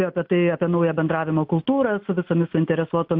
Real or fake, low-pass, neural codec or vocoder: real; 5.4 kHz; none